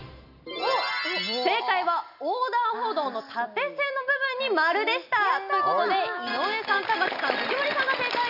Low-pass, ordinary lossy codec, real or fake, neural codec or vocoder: 5.4 kHz; none; real; none